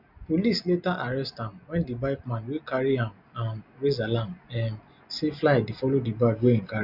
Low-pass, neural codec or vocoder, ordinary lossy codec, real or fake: 5.4 kHz; none; none; real